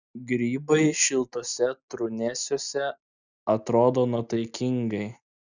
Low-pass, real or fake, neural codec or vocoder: 7.2 kHz; real; none